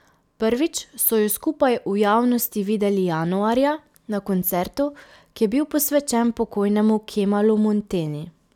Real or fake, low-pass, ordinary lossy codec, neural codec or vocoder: real; 19.8 kHz; none; none